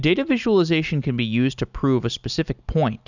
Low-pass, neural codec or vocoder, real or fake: 7.2 kHz; none; real